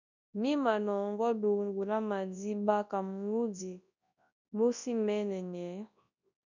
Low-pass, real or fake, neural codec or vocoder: 7.2 kHz; fake; codec, 24 kHz, 0.9 kbps, WavTokenizer, large speech release